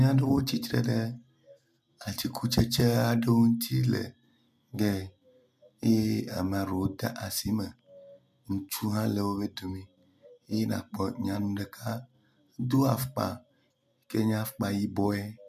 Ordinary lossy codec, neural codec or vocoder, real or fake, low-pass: MP3, 96 kbps; none; real; 14.4 kHz